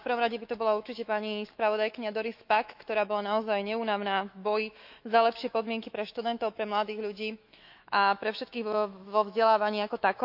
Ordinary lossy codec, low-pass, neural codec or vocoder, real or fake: none; 5.4 kHz; autoencoder, 48 kHz, 128 numbers a frame, DAC-VAE, trained on Japanese speech; fake